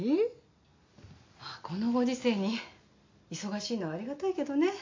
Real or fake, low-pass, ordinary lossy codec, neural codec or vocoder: real; 7.2 kHz; MP3, 48 kbps; none